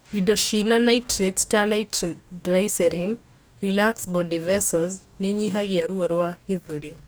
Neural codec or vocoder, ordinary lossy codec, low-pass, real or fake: codec, 44.1 kHz, 2.6 kbps, DAC; none; none; fake